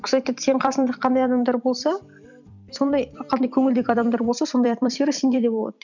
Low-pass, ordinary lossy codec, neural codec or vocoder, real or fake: 7.2 kHz; none; vocoder, 44.1 kHz, 128 mel bands every 256 samples, BigVGAN v2; fake